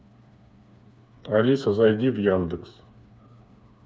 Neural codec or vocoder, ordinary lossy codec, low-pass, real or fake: codec, 16 kHz, 4 kbps, FreqCodec, smaller model; none; none; fake